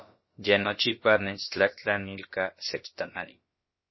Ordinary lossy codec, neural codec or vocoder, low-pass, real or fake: MP3, 24 kbps; codec, 16 kHz, about 1 kbps, DyCAST, with the encoder's durations; 7.2 kHz; fake